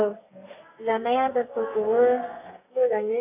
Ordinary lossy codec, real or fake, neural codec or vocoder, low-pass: none; fake; codec, 32 kHz, 1.9 kbps, SNAC; 3.6 kHz